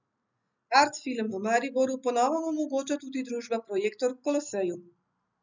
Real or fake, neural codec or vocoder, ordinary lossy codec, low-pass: real; none; none; 7.2 kHz